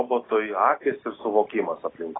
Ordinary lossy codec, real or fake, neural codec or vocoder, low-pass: AAC, 16 kbps; real; none; 7.2 kHz